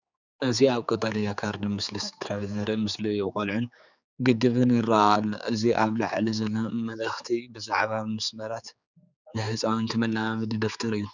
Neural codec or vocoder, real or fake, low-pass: codec, 16 kHz, 4 kbps, X-Codec, HuBERT features, trained on general audio; fake; 7.2 kHz